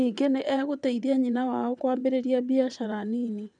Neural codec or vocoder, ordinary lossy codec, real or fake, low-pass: vocoder, 22.05 kHz, 80 mel bands, WaveNeXt; none; fake; 9.9 kHz